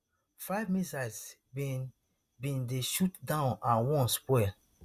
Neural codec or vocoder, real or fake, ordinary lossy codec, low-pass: none; real; Opus, 64 kbps; 14.4 kHz